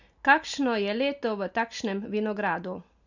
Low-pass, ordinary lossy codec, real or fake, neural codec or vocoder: 7.2 kHz; none; real; none